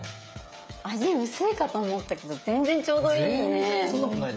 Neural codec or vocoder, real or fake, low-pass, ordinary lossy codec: codec, 16 kHz, 16 kbps, FreqCodec, smaller model; fake; none; none